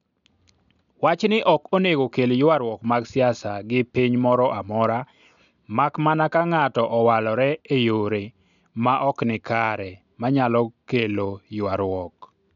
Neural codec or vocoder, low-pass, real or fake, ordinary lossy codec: none; 7.2 kHz; real; none